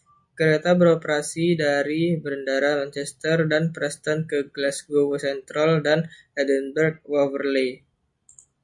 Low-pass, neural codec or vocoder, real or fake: 10.8 kHz; none; real